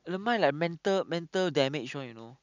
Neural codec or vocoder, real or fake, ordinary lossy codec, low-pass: none; real; none; 7.2 kHz